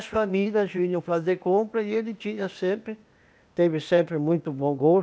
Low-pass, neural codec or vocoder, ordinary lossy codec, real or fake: none; codec, 16 kHz, 0.8 kbps, ZipCodec; none; fake